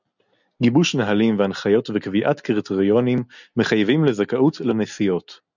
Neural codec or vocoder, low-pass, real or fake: none; 7.2 kHz; real